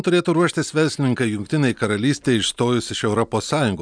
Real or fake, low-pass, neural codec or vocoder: real; 9.9 kHz; none